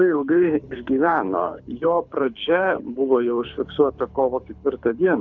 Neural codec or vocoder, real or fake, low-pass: codec, 24 kHz, 6 kbps, HILCodec; fake; 7.2 kHz